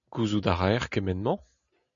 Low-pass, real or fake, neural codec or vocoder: 7.2 kHz; real; none